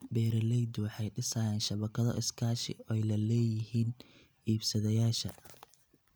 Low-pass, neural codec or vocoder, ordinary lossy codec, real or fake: none; none; none; real